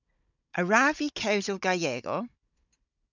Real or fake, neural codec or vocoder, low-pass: fake; codec, 16 kHz, 16 kbps, FunCodec, trained on Chinese and English, 50 frames a second; 7.2 kHz